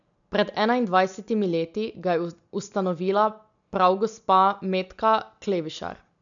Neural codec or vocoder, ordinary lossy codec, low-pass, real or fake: none; none; 7.2 kHz; real